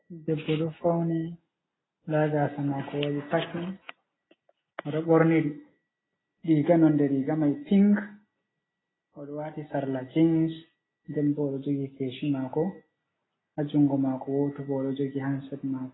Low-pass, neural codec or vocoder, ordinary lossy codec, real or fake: 7.2 kHz; none; AAC, 16 kbps; real